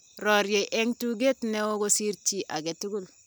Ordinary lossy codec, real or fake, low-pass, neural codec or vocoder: none; real; none; none